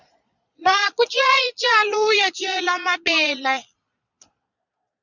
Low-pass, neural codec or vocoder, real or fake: 7.2 kHz; vocoder, 22.05 kHz, 80 mel bands, Vocos; fake